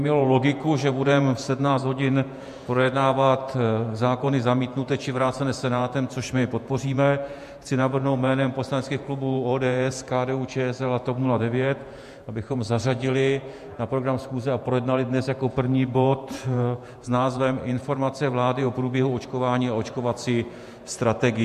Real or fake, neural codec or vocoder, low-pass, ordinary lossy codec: fake; vocoder, 48 kHz, 128 mel bands, Vocos; 14.4 kHz; MP3, 64 kbps